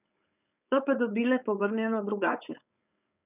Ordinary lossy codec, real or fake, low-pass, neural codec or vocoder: none; fake; 3.6 kHz; codec, 16 kHz, 4.8 kbps, FACodec